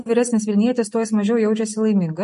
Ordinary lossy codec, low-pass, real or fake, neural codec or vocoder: MP3, 48 kbps; 10.8 kHz; real; none